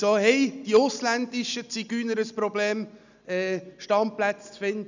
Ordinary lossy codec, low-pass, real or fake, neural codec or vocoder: none; 7.2 kHz; real; none